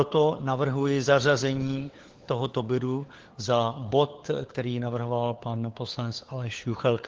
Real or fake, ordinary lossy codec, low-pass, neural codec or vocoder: fake; Opus, 16 kbps; 7.2 kHz; codec, 16 kHz, 4 kbps, FunCodec, trained on LibriTTS, 50 frames a second